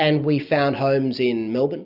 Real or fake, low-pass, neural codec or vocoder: real; 5.4 kHz; none